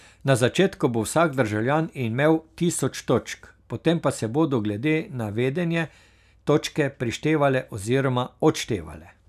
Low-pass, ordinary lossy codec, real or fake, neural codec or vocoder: 14.4 kHz; none; real; none